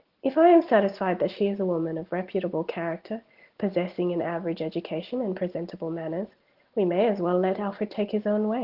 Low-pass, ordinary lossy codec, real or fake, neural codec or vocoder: 5.4 kHz; Opus, 16 kbps; real; none